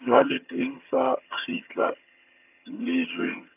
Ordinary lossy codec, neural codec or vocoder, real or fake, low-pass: none; vocoder, 22.05 kHz, 80 mel bands, HiFi-GAN; fake; 3.6 kHz